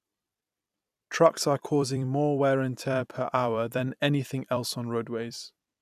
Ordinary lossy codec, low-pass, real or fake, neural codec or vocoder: none; 14.4 kHz; fake; vocoder, 44.1 kHz, 128 mel bands every 256 samples, BigVGAN v2